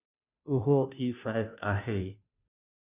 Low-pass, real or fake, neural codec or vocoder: 3.6 kHz; fake; codec, 16 kHz, 0.5 kbps, FunCodec, trained on Chinese and English, 25 frames a second